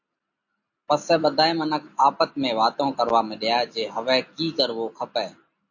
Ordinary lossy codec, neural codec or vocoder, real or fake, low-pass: AAC, 48 kbps; none; real; 7.2 kHz